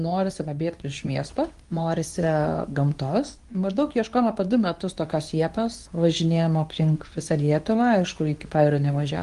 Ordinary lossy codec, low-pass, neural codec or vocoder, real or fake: Opus, 24 kbps; 10.8 kHz; codec, 24 kHz, 0.9 kbps, WavTokenizer, medium speech release version 2; fake